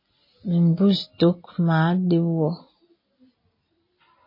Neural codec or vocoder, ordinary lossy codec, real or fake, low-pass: none; MP3, 24 kbps; real; 5.4 kHz